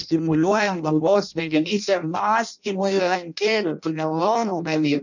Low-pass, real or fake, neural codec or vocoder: 7.2 kHz; fake; codec, 16 kHz in and 24 kHz out, 0.6 kbps, FireRedTTS-2 codec